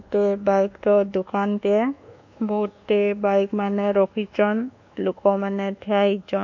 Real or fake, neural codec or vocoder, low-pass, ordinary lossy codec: fake; codec, 24 kHz, 1.2 kbps, DualCodec; 7.2 kHz; none